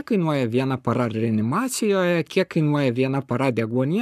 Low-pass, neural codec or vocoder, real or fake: 14.4 kHz; codec, 44.1 kHz, 7.8 kbps, Pupu-Codec; fake